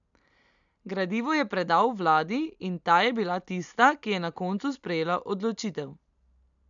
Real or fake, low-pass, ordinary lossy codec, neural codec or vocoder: real; 7.2 kHz; none; none